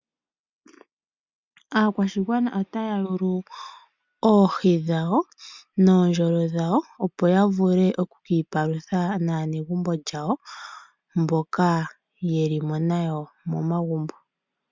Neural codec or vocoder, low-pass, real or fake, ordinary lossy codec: none; 7.2 kHz; real; MP3, 64 kbps